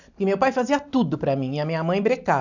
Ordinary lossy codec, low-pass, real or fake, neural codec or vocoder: none; 7.2 kHz; real; none